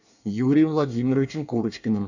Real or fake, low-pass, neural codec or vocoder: fake; 7.2 kHz; codec, 24 kHz, 1 kbps, SNAC